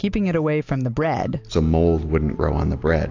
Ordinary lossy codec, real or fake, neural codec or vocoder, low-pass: AAC, 48 kbps; real; none; 7.2 kHz